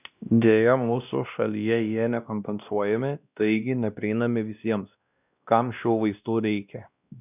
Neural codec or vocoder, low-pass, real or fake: codec, 16 kHz, 1 kbps, X-Codec, WavLM features, trained on Multilingual LibriSpeech; 3.6 kHz; fake